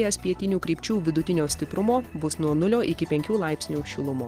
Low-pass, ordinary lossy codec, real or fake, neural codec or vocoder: 14.4 kHz; Opus, 16 kbps; real; none